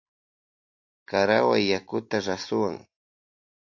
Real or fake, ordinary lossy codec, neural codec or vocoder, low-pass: real; MP3, 48 kbps; none; 7.2 kHz